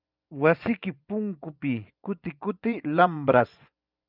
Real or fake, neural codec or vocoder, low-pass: fake; vocoder, 24 kHz, 100 mel bands, Vocos; 5.4 kHz